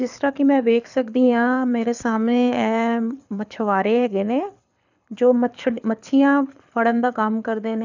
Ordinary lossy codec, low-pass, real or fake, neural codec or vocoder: none; 7.2 kHz; fake; codec, 24 kHz, 6 kbps, HILCodec